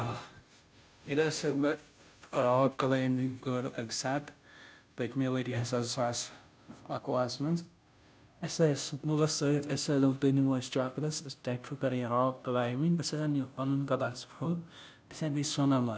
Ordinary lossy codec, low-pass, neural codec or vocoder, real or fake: none; none; codec, 16 kHz, 0.5 kbps, FunCodec, trained on Chinese and English, 25 frames a second; fake